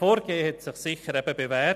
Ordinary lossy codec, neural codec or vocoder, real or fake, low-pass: none; none; real; 14.4 kHz